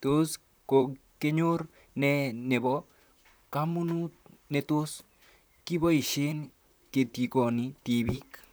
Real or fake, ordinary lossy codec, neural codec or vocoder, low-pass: fake; none; vocoder, 44.1 kHz, 128 mel bands, Pupu-Vocoder; none